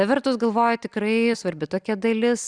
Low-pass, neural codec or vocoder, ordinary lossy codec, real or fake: 9.9 kHz; none; Opus, 64 kbps; real